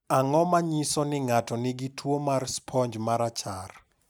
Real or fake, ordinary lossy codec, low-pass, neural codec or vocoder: real; none; none; none